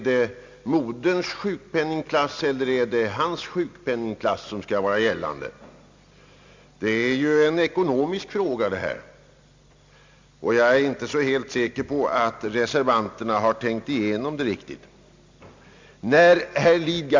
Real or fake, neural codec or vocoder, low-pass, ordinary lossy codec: real; none; 7.2 kHz; MP3, 64 kbps